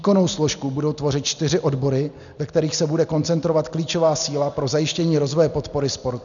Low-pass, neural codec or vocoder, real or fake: 7.2 kHz; none; real